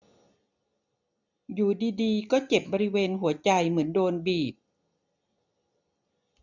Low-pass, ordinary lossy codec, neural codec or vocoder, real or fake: 7.2 kHz; none; none; real